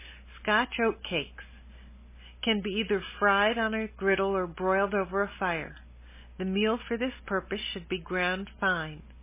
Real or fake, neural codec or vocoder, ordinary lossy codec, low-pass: real; none; MP3, 16 kbps; 3.6 kHz